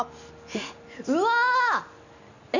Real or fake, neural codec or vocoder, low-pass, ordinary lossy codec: real; none; 7.2 kHz; none